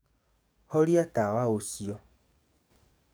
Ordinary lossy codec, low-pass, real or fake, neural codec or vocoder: none; none; fake; codec, 44.1 kHz, 7.8 kbps, DAC